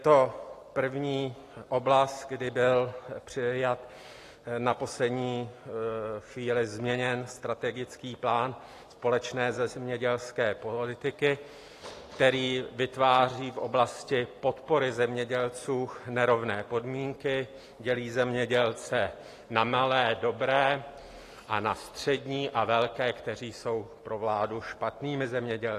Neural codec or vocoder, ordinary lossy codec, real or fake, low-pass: none; AAC, 48 kbps; real; 14.4 kHz